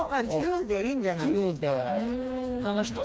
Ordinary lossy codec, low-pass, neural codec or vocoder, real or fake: none; none; codec, 16 kHz, 2 kbps, FreqCodec, smaller model; fake